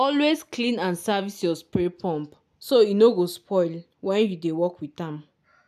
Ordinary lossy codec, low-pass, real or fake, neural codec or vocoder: none; 14.4 kHz; real; none